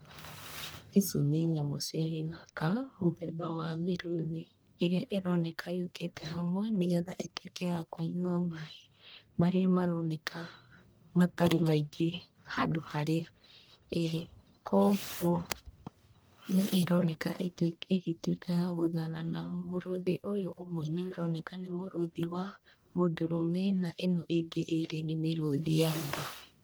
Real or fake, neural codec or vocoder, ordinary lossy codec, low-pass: fake; codec, 44.1 kHz, 1.7 kbps, Pupu-Codec; none; none